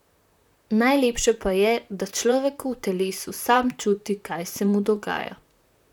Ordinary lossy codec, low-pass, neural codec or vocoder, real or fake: none; 19.8 kHz; vocoder, 44.1 kHz, 128 mel bands, Pupu-Vocoder; fake